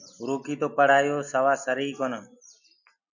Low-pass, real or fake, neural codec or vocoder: 7.2 kHz; real; none